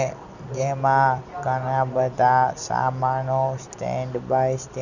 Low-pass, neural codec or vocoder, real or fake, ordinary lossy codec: 7.2 kHz; none; real; none